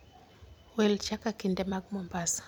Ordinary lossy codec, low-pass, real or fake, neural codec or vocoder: none; none; fake; vocoder, 44.1 kHz, 128 mel bands every 256 samples, BigVGAN v2